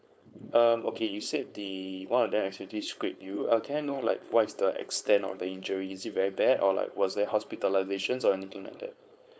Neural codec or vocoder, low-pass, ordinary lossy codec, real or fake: codec, 16 kHz, 4.8 kbps, FACodec; none; none; fake